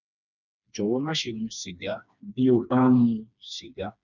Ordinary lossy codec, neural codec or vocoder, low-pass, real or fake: none; codec, 16 kHz, 2 kbps, FreqCodec, smaller model; 7.2 kHz; fake